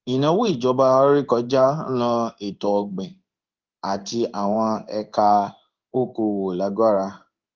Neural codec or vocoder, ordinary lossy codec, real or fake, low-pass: codec, 16 kHz in and 24 kHz out, 1 kbps, XY-Tokenizer; Opus, 32 kbps; fake; 7.2 kHz